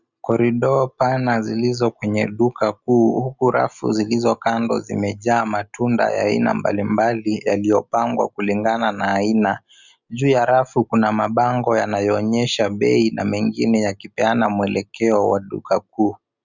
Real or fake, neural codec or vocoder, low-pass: real; none; 7.2 kHz